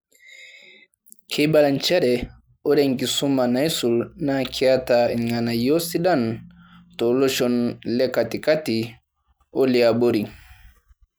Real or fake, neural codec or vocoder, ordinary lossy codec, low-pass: real; none; none; none